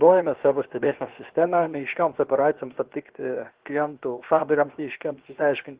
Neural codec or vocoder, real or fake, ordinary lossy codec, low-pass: codec, 16 kHz, 0.7 kbps, FocalCodec; fake; Opus, 16 kbps; 3.6 kHz